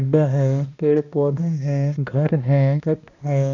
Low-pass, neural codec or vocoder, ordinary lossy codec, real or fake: 7.2 kHz; codec, 16 kHz, 1 kbps, X-Codec, HuBERT features, trained on balanced general audio; none; fake